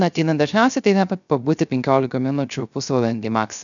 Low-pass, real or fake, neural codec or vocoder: 7.2 kHz; fake; codec, 16 kHz, 0.3 kbps, FocalCodec